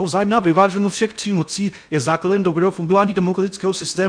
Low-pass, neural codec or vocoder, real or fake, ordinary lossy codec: 9.9 kHz; codec, 16 kHz in and 24 kHz out, 0.6 kbps, FocalCodec, streaming, 4096 codes; fake; MP3, 96 kbps